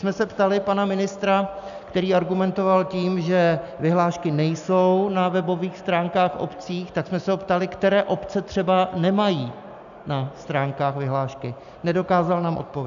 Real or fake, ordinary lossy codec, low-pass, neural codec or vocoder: real; MP3, 96 kbps; 7.2 kHz; none